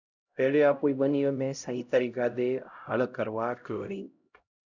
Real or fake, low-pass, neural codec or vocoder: fake; 7.2 kHz; codec, 16 kHz, 0.5 kbps, X-Codec, HuBERT features, trained on LibriSpeech